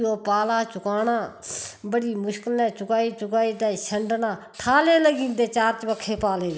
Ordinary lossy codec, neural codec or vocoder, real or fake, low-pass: none; none; real; none